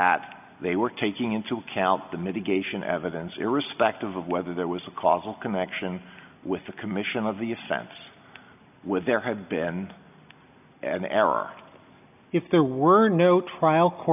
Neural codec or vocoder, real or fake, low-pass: none; real; 3.6 kHz